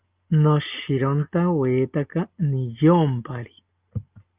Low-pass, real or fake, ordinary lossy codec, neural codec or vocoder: 3.6 kHz; real; Opus, 32 kbps; none